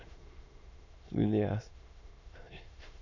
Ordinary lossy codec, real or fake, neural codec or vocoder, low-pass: none; fake; autoencoder, 22.05 kHz, a latent of 192 numbers a frame, VITS, trained on many speakers; 7.2 kHz